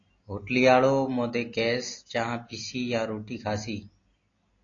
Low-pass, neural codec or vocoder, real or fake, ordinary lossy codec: 7.2 kHz; none; real; AAC, 32 kbps